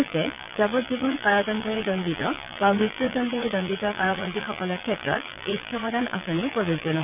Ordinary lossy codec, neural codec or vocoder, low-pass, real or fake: none; vocoder, 22.05 kHz, 80 mel bands, Vocos; 3.6 kHz; fake